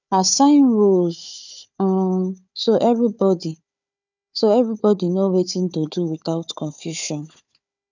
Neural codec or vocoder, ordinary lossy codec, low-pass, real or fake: codec, 16 kHz, 4 kbps, FunCodec, trained on Chinese and English, 50 frames a second; none; 7.2 kHz; fake